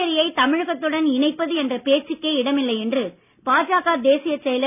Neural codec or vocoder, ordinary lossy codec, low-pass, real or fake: none; none; 3.6 kHz; real